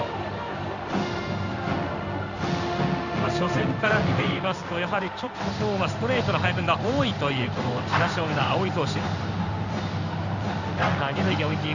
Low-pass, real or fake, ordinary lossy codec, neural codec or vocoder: 7.2 kHz; fake; none; codec, 16 kHz in and 24 kHz out, 1 kbps, XY-Tokenizer